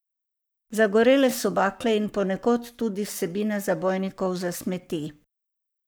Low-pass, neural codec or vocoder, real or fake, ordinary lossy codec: none; codec, 44.1 kHz, 7.8 kbps, Pupu-Codec; fake; none